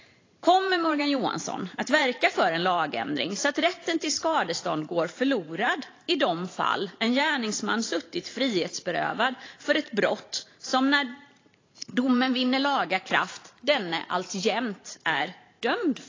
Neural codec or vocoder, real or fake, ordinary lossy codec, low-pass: vocoder, 44.1 kHz, 128 mel bands every 512 samples, BigVGAN v2; fake; AAC, 32 kbps; 7.2 kHz